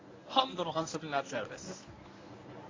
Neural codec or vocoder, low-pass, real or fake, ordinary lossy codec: codec, 24 kHz, 0.9 kbps, WavTokenizer, medium speech release version 1; 7.2 kHz; fake; AAC, 32 kbps